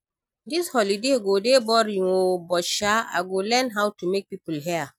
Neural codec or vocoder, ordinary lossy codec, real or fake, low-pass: none; none; real; 19.8 kHz